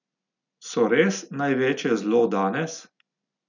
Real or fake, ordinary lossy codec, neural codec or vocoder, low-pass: real; none; none; 7.2 kHz